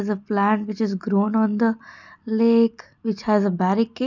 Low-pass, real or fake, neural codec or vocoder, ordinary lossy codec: 7.2 kHz; real; none; none